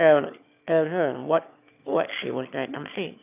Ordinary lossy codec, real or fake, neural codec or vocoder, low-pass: none; fake; autoencoder, 22.05 kHz, a latent of 192 numbers a frame, VITS, trained on one speaker; 3.6 kHz